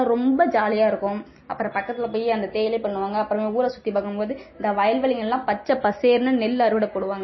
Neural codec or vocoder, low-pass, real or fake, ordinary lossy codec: none; 7.2 kHz; real; MP3, 24 kbps